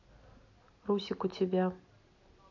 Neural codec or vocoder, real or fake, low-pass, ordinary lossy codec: none; real; 7.2 kHz; AAC, 48 kbps